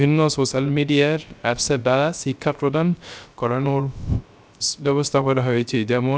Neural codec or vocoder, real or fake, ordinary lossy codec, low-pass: codec, 16 kHz, 0.3 kbps, FocalCodec; fake; none; none